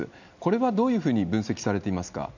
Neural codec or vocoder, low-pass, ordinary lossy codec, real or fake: none; 7.2 kHz; none; real